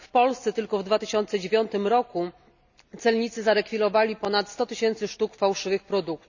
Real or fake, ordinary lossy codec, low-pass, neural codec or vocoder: real; none; 7.2 kHz; none